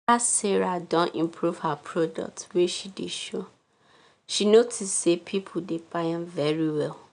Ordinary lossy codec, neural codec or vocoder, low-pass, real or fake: none; none; 9.9 kHz; real